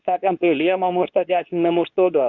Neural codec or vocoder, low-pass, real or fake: codec, 24 kHz, 0.9 kbps, WavTokenizer, medium speech release version 2; 7.2 kHz; fake